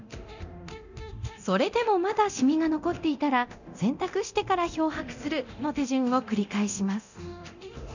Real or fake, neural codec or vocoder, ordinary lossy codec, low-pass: fake; codec, 24 kHz, 0.9 kbps, DualCodec; none; 7.2 kHz